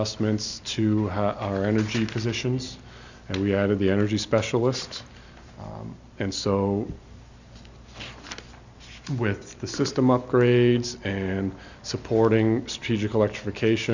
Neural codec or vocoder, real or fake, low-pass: none; real; 7.2 kHz